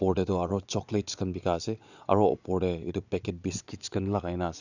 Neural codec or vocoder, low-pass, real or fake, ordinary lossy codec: vocoder, 22.05 kHz, 80 mel bands, Vocos; 7.2 kHz; fake; none